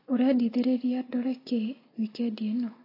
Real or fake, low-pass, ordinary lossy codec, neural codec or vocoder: real; 5.4 kHz; AAC, 24 kbps; none